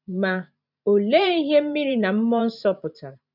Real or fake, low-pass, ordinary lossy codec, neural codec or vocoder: fake; 5.4 kHz; none; vocoder, 44.1 kHz, 128 mel bands every 512 samples, BigVGAN v2